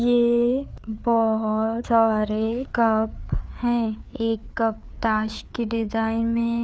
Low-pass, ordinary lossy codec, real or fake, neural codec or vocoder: none; none; fake; codec, 16 kHz, 4 kbps, FreqCodec, larger model